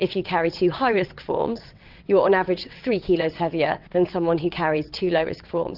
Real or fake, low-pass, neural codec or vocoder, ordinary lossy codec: fake; 5.4 kHz; vocoder, 22.05 kHz, 80 mel bands, WaveNeXt; Opus, 32 kbps